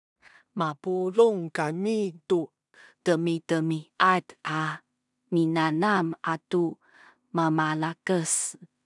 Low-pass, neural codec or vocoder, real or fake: 10.8 kHz; codec, 16 kHz in and 24 kHz out, 0.4 kbps, LongCat-Audio-Codec, two codebook decoder; fake